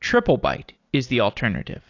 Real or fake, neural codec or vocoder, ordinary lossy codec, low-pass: real; none; AAC, 48 kbps; 7.2 kHz